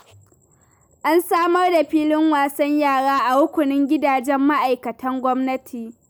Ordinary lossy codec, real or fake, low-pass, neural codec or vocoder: none; real; none; none